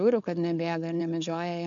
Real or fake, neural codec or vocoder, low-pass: fake; codec, 16 kHz, 4.8 kbps, FACodec; 7.2 kHz